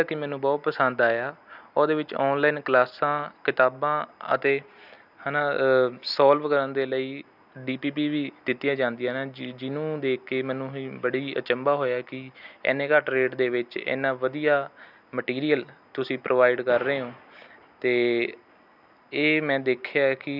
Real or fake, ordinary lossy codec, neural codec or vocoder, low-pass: real; none; none; 5.4 kHz